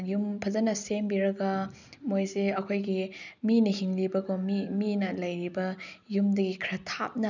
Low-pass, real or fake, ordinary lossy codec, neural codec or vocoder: 7.2 kHz; real; none; none